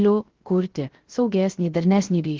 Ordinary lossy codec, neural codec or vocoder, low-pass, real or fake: Opus, 16 kbps; codec, 16 kHz, 0.3 kbps, FocalCodec; 7.2 kHz; fake